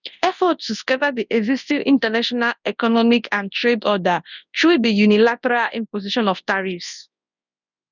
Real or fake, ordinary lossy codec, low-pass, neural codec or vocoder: fake; none; 7.2 kHz; codec, 24 kHz, 0.9 kbps, WavTokenizer, large speech release